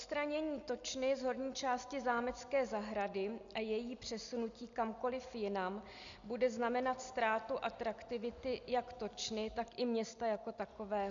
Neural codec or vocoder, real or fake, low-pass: none; real; 7.2 kHz